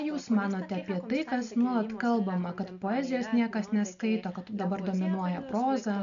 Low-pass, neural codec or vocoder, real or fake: 7.2 kHz; none; real